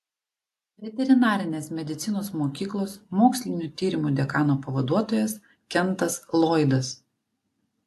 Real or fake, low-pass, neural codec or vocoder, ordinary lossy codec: real; 14.4 kHz; none; AAC, 64 kbps